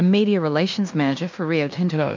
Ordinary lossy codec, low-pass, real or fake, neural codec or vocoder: MP3, 64 kbps; 7.2 kHz; fake; codec, 16 kHz in and 24 kHz out, 0.9 kbps, LongCat-Audio-Codec, fine tuned four codebook decoder